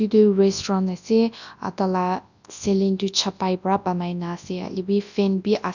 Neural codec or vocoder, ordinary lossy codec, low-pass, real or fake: codec, 24 kHz, 0.9 kbps, WavTokenizer, large speech release; none; 7.2 kHz; fake